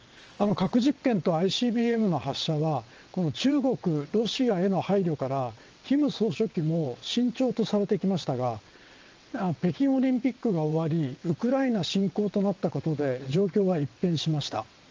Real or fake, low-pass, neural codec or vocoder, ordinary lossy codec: fake; 7.2 kHz; vocoder, 22.05 kHz, 80 mel bands, WaveNeXt; Opus, 24 kbps